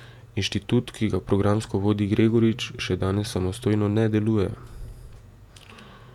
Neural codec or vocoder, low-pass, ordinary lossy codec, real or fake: vocoder, 48 kHz, 128 mel bands, Vocos; 19.8 kHz; none; fake